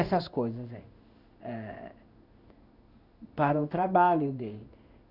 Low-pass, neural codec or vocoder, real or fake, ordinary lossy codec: 5.4 kHz; codec, 16 kHz in and 24 kHz out, 1 kbps, XY-Tokenizer; fake; none